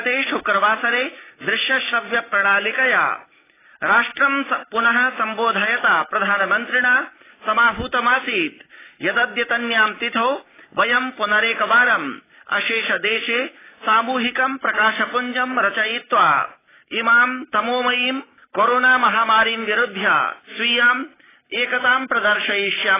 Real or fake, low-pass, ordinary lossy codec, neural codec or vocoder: real; 3.6 kHz; AAC, 16 kbps; none